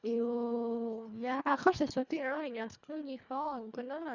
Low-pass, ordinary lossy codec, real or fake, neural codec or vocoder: 7.2 kHz; none; fake; codec, 24 kHz, 1.5 kbps, HILCodec